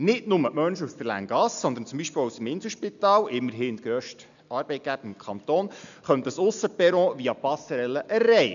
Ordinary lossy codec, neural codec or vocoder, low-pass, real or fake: none; none; 7.2 kHz; real